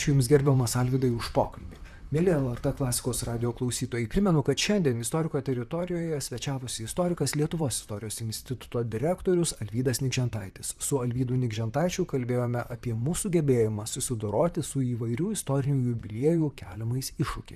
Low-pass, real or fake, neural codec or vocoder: 14.4 kHz; fake; vocoder, 44.1 kHz, 128 mel bands, Pupu-Vocoder